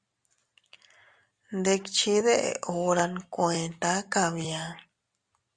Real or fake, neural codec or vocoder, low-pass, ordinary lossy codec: real; none; 9.9 kHz; Opus, 64 kbps